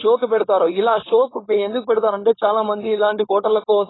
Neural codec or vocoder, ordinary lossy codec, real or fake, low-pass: codec, 16 kHz, 4.8 kbps, FACodec; AAC, 16 kbps; fake; 7.2 kHz